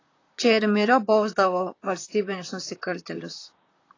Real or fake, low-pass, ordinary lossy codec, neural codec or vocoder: fake; 7.2 kHz; AAC, 32 kbps; vocoder, 44.1 kHz, 128 mel bands, Pupu-Vocoder